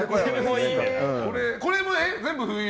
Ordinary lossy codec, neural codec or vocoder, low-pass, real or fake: none; none; none; real